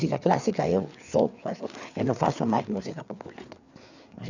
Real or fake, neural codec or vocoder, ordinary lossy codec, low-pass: fake; codec, 16 kHz, 4 kbps, FreqCodec, larger model; none; 7.2 kHz